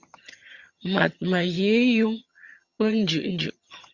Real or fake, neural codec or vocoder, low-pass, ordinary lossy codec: fake; vocoder, 22.05 kHz, 80 mel bands, HiFi-GAN; 7.2 kHz; Opus, 64 kbps